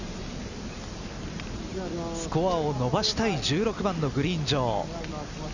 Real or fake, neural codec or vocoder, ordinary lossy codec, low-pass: real; none; none; 7.2 kHz